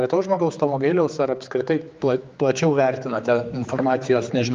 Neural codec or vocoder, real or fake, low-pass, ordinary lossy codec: codec, 16 kHz, 4 kbps, X-Codec, HuBERT features, trained on general audio; fake; 7.2 kHz; Opus, 24 kbps